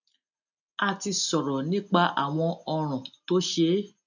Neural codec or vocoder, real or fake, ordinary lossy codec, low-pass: none; real; none; 7.2 kHz